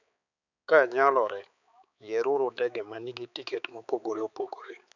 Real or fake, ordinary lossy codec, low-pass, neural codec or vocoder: fake; none; 7.2 kHz; codec, 16 kHz, 4 kbps, X-Codec, HuBERT features, trained on balanced general audio